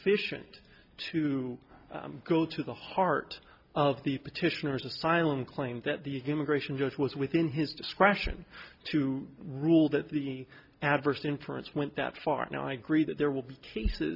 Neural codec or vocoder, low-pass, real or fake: none; 5.4 kHz; real